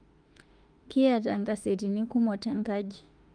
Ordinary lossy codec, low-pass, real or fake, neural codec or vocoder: Opus, 32 kbps; 9.9 kHz; fake; autoencoder, 48 kHz, 32 numbers a frame, DAC-VAE, trained on Japanese speech